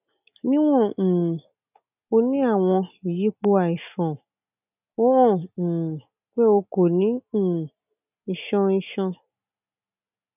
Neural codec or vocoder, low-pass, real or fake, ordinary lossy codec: none; 3.6 kHz; real; none